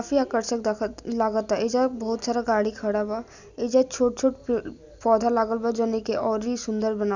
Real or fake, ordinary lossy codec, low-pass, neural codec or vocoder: real; none; 7.2 kHz; none